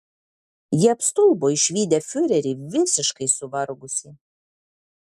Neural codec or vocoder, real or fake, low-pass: none; real; 14.4 kHz